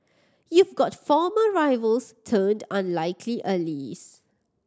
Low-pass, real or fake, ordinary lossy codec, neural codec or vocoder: none; real; none; none